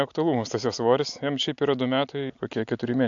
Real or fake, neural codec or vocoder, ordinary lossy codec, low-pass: real; none; AAC, 64 kbps; 7.2 kHz